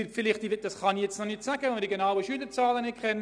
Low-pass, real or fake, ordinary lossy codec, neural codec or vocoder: 9.9 kHz; real; none; none